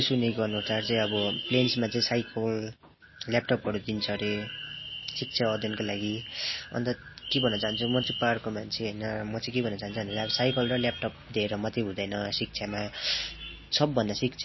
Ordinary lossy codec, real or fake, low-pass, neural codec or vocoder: MP3, 24 kbps; real; 7.2 kHz; none